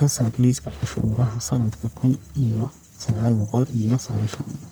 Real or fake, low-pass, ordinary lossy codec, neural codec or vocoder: fake; none; none; codec, 44.1 kHz, 1.7 kbps, Pupu-Codec